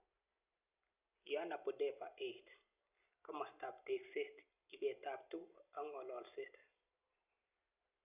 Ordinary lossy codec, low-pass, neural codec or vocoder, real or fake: none; 3.6 kHz; none; real